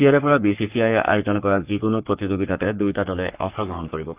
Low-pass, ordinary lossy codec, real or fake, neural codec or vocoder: 3.6 kHz; Opus, 32 kbps; fake; codec, 44.1 kHz, 3.4 kbps, Pupu-Codec